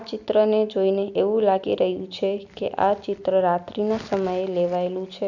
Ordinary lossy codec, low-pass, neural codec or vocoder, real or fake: none; 7.2 kHz; none; real